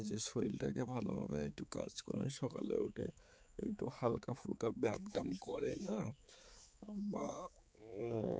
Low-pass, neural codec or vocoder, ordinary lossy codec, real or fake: none; codec, 16 kHz, 4 kbps, X-Codec, HuBERT features, trained on balanced general audio; none; fake